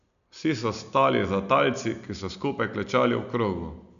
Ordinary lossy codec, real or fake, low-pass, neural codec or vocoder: none; real; 7.2 kHz; none